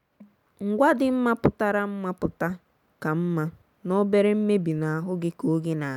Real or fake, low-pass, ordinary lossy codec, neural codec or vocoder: real; 19.8 kHz; none; none